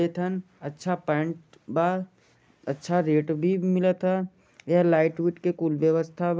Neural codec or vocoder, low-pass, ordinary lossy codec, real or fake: none; none; none; real